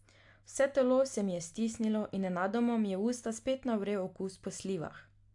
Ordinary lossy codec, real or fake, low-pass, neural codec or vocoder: AAC, 64 kbps; real; 10.8 kHz; none